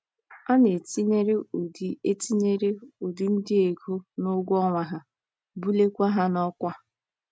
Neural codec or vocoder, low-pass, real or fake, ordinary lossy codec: none; none; real; none